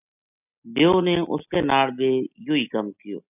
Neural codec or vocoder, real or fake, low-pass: none; real; 3.6 kHz